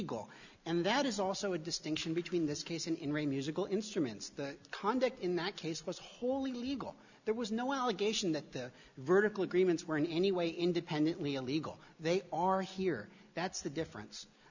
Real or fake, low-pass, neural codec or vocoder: real; 7.2 kHz; none